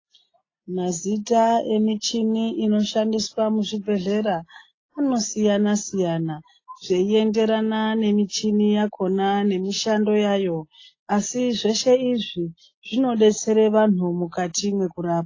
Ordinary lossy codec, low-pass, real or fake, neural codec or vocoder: AAC, 32 kbps; 7.2 kHz; real; none